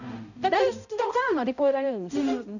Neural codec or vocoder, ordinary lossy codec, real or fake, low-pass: codec, 16 kHz, 0.5 kbps, X-Codec, HuBERT features, trained on balanced general audio; none; fake; 7.2 kHz